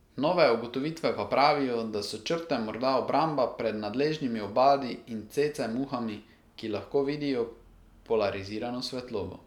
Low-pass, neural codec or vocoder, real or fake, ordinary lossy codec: 19.8 kHz; none; real; none